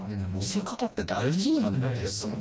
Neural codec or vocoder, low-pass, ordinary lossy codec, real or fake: codec, 16 kHz, 1 kbps, FreqCodec, smaller model; none; none; fake